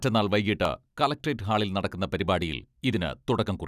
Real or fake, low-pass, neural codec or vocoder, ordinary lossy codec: real; 14.4 kHz; none; none